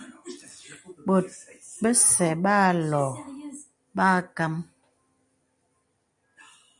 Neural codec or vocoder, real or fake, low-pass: none; real; 10.8 kHz